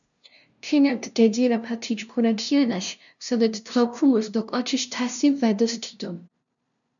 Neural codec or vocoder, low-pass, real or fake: codec, 16 kHz, 0.5 kbps, FunCodec, trained on LibriTTS, 25 frames a second; 7.2 kHz; fake